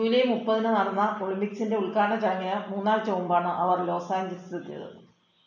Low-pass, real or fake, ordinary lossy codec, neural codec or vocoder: 7.2 kHz; real; none; none